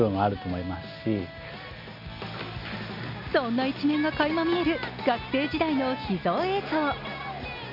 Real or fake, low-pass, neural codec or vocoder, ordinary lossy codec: real; 5.4 kHz; none; none